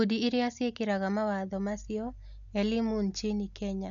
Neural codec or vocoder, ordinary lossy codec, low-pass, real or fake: none; none; 7.2 kHz; real